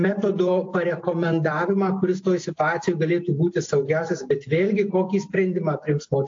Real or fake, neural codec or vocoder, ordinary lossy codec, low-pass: real; none; AAC, 48 kbps; 7.2 kHz